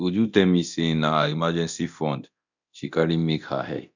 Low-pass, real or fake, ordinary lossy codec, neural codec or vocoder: 7.2 kHz; fake; none; codec, 24 kHz, 0.9 kbps, DualCodec